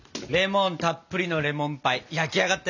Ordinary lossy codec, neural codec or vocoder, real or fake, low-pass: none; none; real; 7.2 kHz